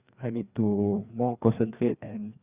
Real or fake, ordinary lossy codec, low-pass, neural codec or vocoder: fake; Opus, 64 kbps; 3.6 kHz; codec, 16 kHz, 2 kbps, FreqCodec, larger model